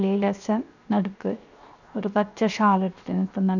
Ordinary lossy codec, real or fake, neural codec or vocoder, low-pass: none; fake; codec, 16 kHz, 0.7 kbps, FocalCodec; 7.2 kHz